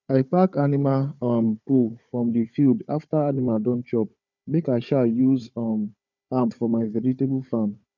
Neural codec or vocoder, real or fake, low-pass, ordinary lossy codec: codec, 16 kHz, 4 kbps, FunCodec, trained on Chinese and English, 50 frames a second; fake; 7.2 kHz; none